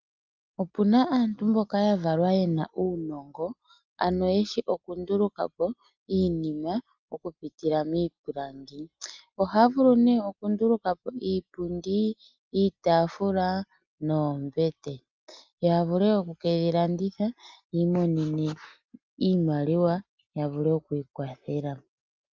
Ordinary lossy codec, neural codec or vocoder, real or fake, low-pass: Opus, 24 kbps; none; real; 7.2 kHz